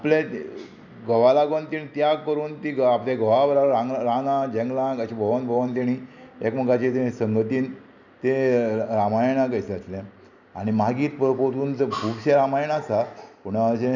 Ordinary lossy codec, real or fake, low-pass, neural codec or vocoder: none; real; 7.2 kHz; none